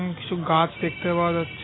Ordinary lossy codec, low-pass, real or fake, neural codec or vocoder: AAC, 16 kbps; 7.2 kHz; real; none